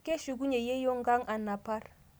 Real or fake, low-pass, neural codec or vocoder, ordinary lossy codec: real; none; none; none